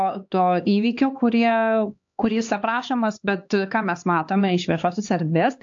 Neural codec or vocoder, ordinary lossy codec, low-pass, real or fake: codec, 16 kHz, 2 kbps, X-Codec, HuBERT features, trained on LibriSpeech; AAC, 64 kbps; 7.2 kHz; fake